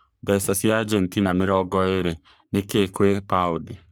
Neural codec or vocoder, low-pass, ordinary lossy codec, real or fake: codec, 44.1 kHz, 3.4 kbps, Pupu-Codec; none; none; fake